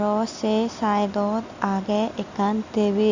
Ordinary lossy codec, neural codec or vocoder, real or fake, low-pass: Opus, 64 kbps; none; real; 7.2 kHz